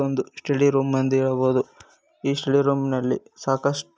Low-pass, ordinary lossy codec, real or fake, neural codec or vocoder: none; none; real; none